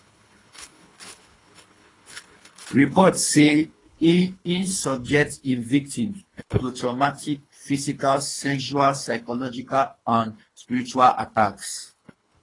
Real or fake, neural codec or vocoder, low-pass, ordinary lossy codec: fake; codec, 24 kHz, 3 kbps, HILCodec; 10.8 kHz; AAC, 32 kbps